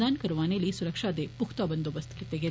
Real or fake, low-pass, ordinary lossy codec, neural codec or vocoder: real; none; none; none